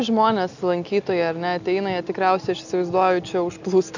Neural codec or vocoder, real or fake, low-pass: none; real; 7.2 kHz